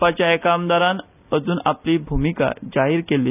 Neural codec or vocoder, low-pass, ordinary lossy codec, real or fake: none; 3.6 kHz; none; real